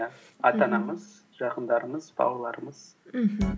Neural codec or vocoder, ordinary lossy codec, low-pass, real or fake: none; none; none; real